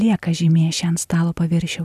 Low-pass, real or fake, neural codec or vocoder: 14.4 kHz; real; none